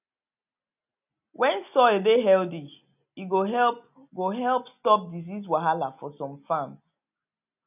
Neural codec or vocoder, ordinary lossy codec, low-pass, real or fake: none; none; 3.6 kHz; real